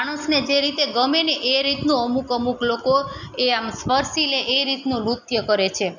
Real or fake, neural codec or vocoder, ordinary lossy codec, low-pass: real; none; none; 7.2 kHz